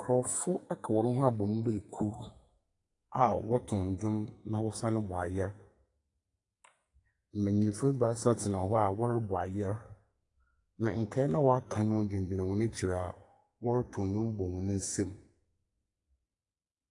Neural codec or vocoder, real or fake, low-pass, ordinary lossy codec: codec, 44.1 kHz, 2.6 kbps, SNAC; fake; 10.8 kHz; AAC, 48 kbps